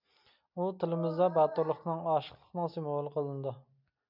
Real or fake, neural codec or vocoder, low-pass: real; none; 5.4 kHz